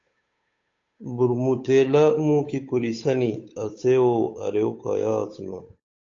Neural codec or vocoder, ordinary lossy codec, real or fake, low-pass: codec, 16 kHz, 8 kbps, FunCodec, trained on Chinese and English, 25 frames a second; AAC, 48 kbps; fake; 7.2 kHz